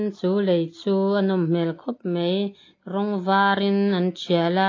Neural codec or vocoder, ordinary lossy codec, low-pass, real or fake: none; AAC, 32 kbps; 7.2 kHz; real